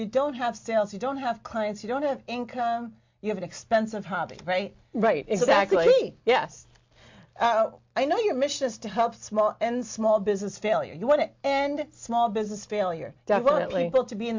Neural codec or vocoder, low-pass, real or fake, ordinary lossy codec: none; 7.2 kHz; real; MP3, 48 kbps